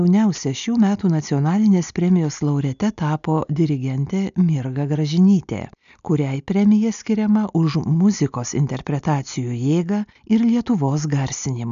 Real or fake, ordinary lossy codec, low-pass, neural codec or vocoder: real; MP3, 96 kbps; 7.2 kHz; none